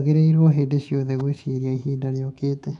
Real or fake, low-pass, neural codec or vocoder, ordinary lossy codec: fake; 10.8 kHz; autoencoder, 48 kHz, 128 numbers a frame, DAC-VAE, trained on Japanese speech; none